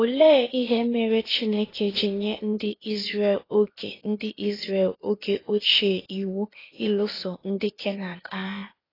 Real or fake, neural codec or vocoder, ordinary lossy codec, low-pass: fake; codec, 16 kHz, 0.8 kbps, ZipCodec; AAC, 24 kbps; 5.4 kHz